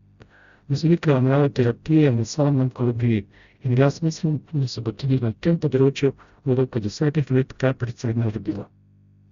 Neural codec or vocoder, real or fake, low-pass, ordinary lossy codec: codec, 16 kHz, 0.5 kbps, FreqCodec, smaller model; fake; 7.2 kHz; Opus, 64 kbps